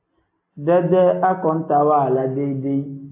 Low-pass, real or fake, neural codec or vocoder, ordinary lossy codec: 3.6 kHz; real; none; AAC, 24 kbps